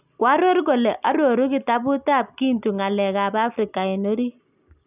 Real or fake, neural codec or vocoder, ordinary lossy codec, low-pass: real; none; none; 3.6 kHz